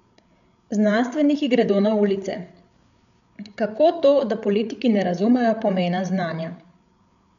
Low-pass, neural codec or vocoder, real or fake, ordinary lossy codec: 7.2 kHz; codec, 16 kHz, 8 kbps, FreqCodec, larger model; fake; none